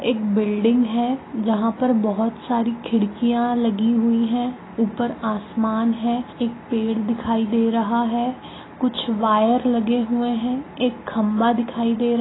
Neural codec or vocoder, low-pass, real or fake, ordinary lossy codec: none; 7.2 kHz; real; AAC, 16 kbps